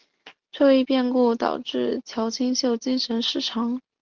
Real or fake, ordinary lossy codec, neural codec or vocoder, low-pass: real; Opus, 16 kbps; none; 7.2 kHz